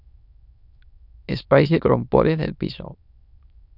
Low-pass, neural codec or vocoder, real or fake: 5.4 kHz; autoencoder, 22.05 kHz, a latent of 192 numbers a frame, VITS, trained on many speakers; fake